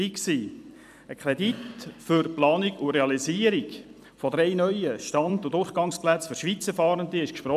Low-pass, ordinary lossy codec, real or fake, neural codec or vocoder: 14.4 kHz; none; fake; vocoder, 44.1 kHz, 128 mel bands every 256 samples, BigVGAN v2